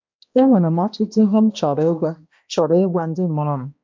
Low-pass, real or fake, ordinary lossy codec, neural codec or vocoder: 7.2 kHz; fake; MP3, 48 kbps; codec, 16 kHz, 1 kbps, X-Codec, HuBERT features, trained on balanced general audio